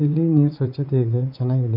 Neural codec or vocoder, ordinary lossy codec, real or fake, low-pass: vocoder, 44.1 kHz, 80 mel bands, Vocos; MP3, 48 kbps; fake; 5.4 kHz